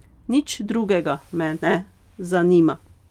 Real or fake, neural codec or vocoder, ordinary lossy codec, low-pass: real; none; Opus, 32 kbps; 19.8 kHz